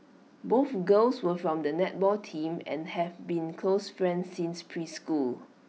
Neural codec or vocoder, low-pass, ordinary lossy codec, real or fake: none; none; none; real